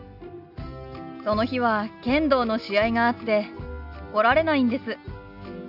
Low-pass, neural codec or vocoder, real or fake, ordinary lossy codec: 5.4 kHz; none; real; AAC, 48 kbps